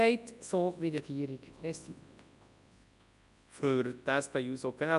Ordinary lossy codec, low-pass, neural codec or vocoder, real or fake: AAC, 96 kbps; 10.8 kHz; codec, 24 kHz, 0.9 kbps, WavTokenizer, large speech release; fake